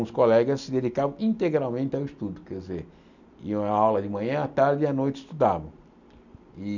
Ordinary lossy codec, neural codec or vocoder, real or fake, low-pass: none; none; real; 7.2 kHz